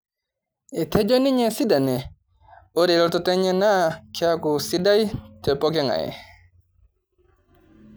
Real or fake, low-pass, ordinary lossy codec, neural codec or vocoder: real; none; none; none